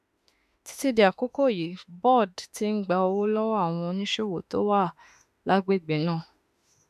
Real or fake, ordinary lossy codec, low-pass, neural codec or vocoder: fake; none; 14.4 kHz; autoencoder, 48 kHz, 32 numbers a frame, DAC-VAE, trained on Japanese speech